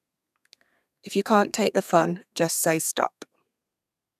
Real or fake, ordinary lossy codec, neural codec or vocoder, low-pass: fake; none; codec, 32 kHz, 1.9 kbps, SNAC; 14.4 kHz